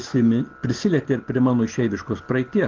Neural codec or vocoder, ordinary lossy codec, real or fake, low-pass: none; Opus, 16 kbps; real; 7.2 kHz